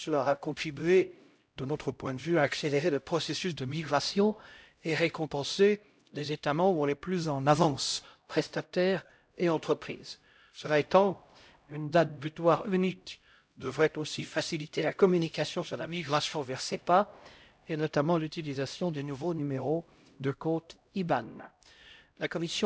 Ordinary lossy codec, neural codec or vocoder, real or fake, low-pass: none; codec, 16 kHz, 0.5 kbps, X-Codec, HuBERT features, trained on LibriSpeech; fake; none